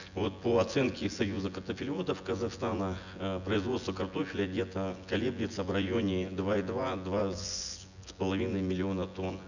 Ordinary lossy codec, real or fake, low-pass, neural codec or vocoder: none; fake; 7.2 kHz; vocoder, 24 kHz, 100 mel bands, Vocos